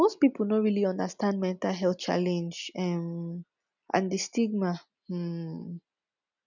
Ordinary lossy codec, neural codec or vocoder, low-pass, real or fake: none; none; 7.2 kHz; real